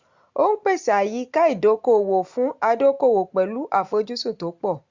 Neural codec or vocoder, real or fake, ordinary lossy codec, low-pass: none; real; Opus, 64 kbps; 7.2 kHz